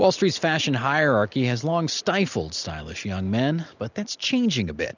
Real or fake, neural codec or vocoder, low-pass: real; none; 7.2 kHz